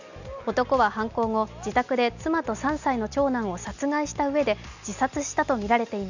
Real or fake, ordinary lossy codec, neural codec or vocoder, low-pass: real; none; none; 7.2 kHz